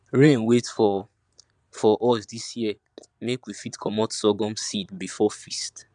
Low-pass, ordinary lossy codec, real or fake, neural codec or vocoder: 9.9 kHz; none; fake; vocoder, 22.05 kHz, 80 mel bands, Vocos